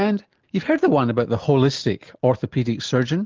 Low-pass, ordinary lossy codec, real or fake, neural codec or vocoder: 7.2 kHz; Opus, 32 kbps; real; none